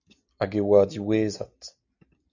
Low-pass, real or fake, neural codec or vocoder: 7.2 kHz; real; none